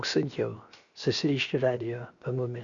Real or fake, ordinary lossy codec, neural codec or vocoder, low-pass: fake; Opus, 64 kbps; codec, 16 kHz, 0.7 kbps, FocalCodec; 7.2 kHz